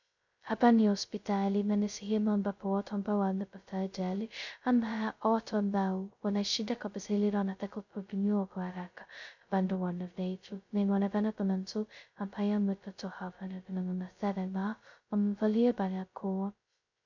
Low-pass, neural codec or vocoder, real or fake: 7.2 kHz; codec, 16 kHz, 0.2 kbps, FocalCodec; fake